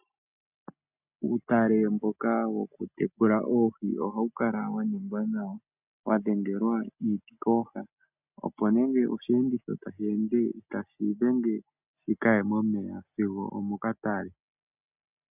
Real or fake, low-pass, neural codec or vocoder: real; 3.6 kHz; none